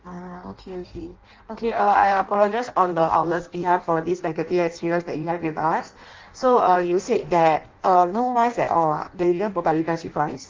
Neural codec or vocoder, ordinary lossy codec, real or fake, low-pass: codec, 16 kHz in and 24 kHz out, 0.6 kbps, FireRedTTS-2 codec; Opus, 32 kbps; fake; 7.2 kHz